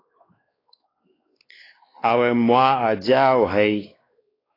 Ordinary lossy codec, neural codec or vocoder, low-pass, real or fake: AAC, 24 kbps; codec, 16 kHz, 2 kbps, X-Codec, WavLM features, trained on Multilingual LibriSpeech; 5.4 kHz; fake